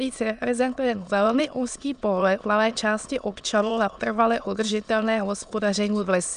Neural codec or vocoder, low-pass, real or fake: autoencoder, 22.05 kHz, a latent of 192 numbers a frame, VITS, trained on many speakers; 9.9 kHz; fake